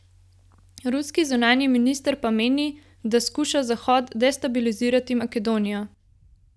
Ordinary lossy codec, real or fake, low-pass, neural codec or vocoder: none; real; none; none